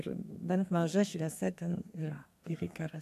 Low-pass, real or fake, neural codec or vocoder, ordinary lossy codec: 14.4 kHz; fake; codec, 32 kHz, 1.9 kbps, SNAC; AAC, 96 kbps